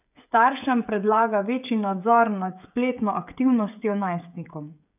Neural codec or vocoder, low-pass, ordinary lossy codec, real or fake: codec, 16 kHz, 16 kbps, FreqCodec, smaller model; 3.6 kHz; none; fake